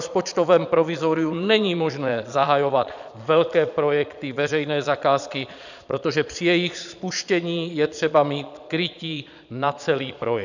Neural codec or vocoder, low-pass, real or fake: vocoder, 22.05 kHz, 80 mel bands, Vocos; 7.2 kHz; fake